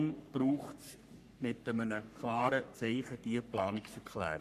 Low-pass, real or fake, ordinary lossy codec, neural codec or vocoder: 14.4 kHz; fake; none; codec, 44.1 kHz, 3.4 kbps, Pupu-Codec